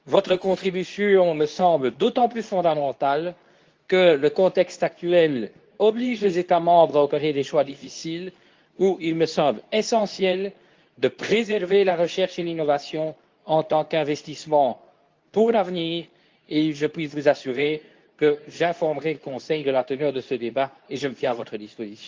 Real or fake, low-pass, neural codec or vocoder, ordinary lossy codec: fake; 7.2 kHz; codec, 24 kHz, 0.9 kbps, WavTokenizer, medium speech release version 2; Opus, 24 kbps